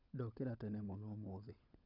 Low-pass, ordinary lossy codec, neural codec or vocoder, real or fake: 5.4 kHz; none; codec, 16 kHz, 16 kbps, FunCodec, trained on LibriTTS, 50 frames a second; fake